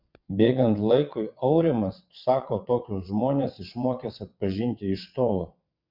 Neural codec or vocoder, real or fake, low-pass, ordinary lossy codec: vocoder, 22.05 kHz, 80 mel bands, WaveNeXt; fake; 5.4 kHz; MP3, 48 kbps